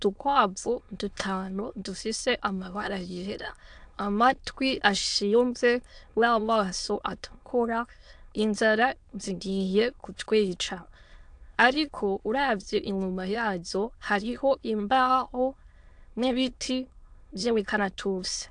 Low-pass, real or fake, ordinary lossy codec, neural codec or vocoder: 9.9 kHz; fake; AAC, 64 kbps; autoencoder, 22.05 kHz, a latent of 192 numbers a frame, VITS, trained on many speakers